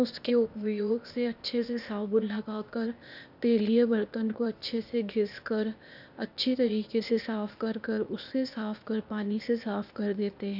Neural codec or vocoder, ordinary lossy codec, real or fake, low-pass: codec, 16 kHz, 0.8 kbps, ZipCodec; none; fake; 5.4 kHz